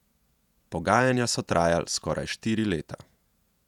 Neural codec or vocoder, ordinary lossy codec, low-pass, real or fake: vocoder, 48 kHz, 128 mel bands, Vocos; none; 19.8 kHz; fake